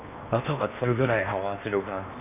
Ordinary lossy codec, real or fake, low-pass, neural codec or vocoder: none; fake; 3.6 kHz; codec, 16 kHz in and 24 kHz out, 0.8 kbps, FocalCodec, streaming, 65536 codes